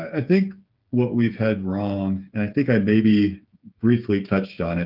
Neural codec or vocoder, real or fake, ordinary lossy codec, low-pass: codec, 16 kHz, 8 kbps, FreqCodec, smaller model; fake; Opus, 32 kbps; 5.4 kHz